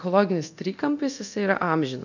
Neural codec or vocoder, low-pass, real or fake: codec, 24 kHz, 0.9 kbps, DualCodec; 7.2 kHz; fake